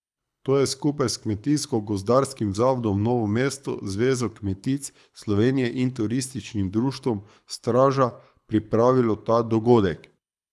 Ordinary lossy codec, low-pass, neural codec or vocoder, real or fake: none; none; codec, 24 kHz, 6 kbps, HILCodec; fake